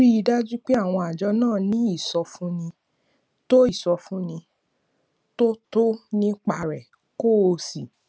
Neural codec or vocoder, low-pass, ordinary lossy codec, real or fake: none; none; none; real